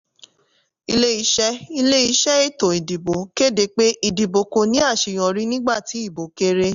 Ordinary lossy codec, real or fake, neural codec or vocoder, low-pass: none; real; none; 7.2 kHz